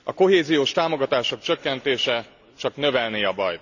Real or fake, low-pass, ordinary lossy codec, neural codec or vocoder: real; 7.2 kHz; none; none